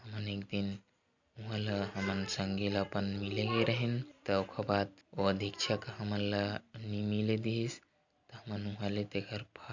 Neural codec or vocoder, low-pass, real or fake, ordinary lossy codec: none; 7.2 kHz; real; Opus, 64 kbps